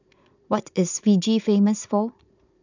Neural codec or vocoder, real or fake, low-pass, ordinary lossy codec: vocoder, 44.1 kHz, 80 mel bands, Vocos; fake; 7.2 kHz; none